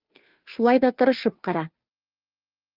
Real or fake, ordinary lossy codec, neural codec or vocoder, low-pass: fake; Opus, 24 kbps; codec, 16 kHz, 2 kbps, FunCodec, trained on Chinese and English, 25 frames a second; 5.4 kHz